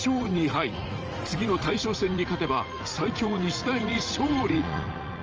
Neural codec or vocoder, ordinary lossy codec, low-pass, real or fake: vocoder, 44.1 kHz, 80 mel bands, Vocos; Opus, 24 kbps; 7.2 kHz; fake